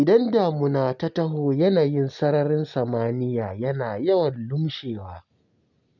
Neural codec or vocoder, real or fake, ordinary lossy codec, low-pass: none; real; none; 7.2 kHz